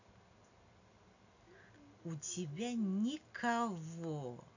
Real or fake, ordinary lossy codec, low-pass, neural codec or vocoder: real; none; 7.2 kHz; none